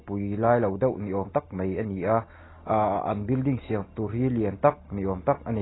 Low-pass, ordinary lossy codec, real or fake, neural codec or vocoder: 7.2 kHz; AAC, 16 kbps; real; none